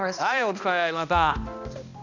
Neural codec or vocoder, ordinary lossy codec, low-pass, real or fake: codec, 16 kHz, 1 kbps, X-Codec, HuBERT features, trained on balanced general audio; none; 7.2 kHz; fake